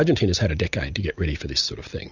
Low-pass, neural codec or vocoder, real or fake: 7.2 kHz; none; real